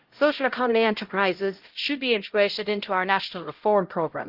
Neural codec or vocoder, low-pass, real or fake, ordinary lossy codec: codec, 16 kHz, 0.5 kbps, X-Codec, HuBERT features, trained on LibriSpeech; 5.4 kHz; fake; Opus, 16 kbps